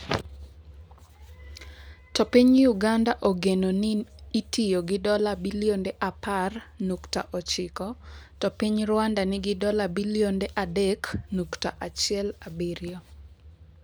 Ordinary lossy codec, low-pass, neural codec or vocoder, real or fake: none; none; none; real